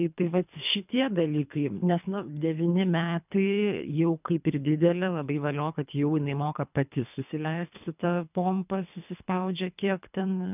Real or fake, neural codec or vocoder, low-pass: fake; codec, 24 kHz, 3 kbps, HILCodec; 3.6 kHz